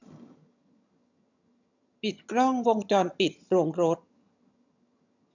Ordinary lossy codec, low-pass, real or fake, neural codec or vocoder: none; 7.2 kHz; fake; vocoder, 22.05 kHz, 80 mel bands, HiFi-GAN